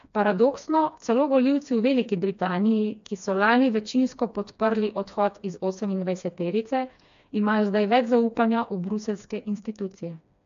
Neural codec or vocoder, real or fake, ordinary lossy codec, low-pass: codec, 16 kHz, 2 kbps, FreqCodec, smaller model; fake; AAC, 64 kbps; 7.2 kHz